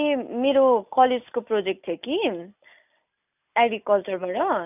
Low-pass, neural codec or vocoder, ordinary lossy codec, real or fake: 3.6 kHz; none; none; real